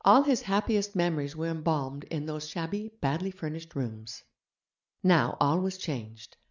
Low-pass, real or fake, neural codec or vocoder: 7.2 kHz; real; none